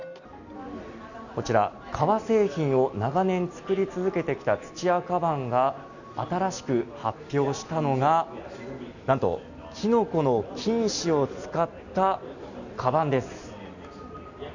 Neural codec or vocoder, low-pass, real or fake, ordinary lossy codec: none; 7.2 kHz; real; none